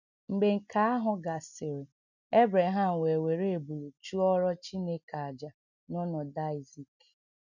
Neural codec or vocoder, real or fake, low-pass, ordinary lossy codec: none; real; 7.2 kHz; none